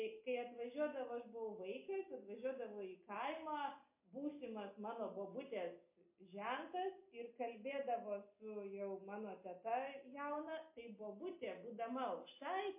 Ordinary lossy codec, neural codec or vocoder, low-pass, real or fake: MP3, 24 kbps; none; 3.6 kHz; real